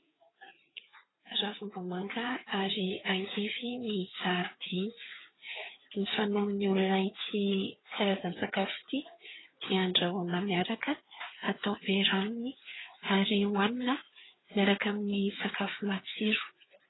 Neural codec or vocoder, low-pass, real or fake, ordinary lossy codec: codec, 16 kHz, 4 kbps, FreqCodec, larger model; 7.2 kHz; fake; AAC, 16 kbps